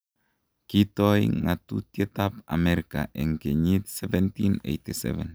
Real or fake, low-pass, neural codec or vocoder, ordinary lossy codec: real; none; none; none